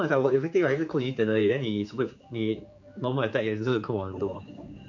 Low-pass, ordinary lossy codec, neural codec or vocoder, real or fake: 7.2 kHz; MP3, 48 kbps; codec, 16 kHz, 4 kbps, X-Codec, HuBERT features, trained on general audio; fake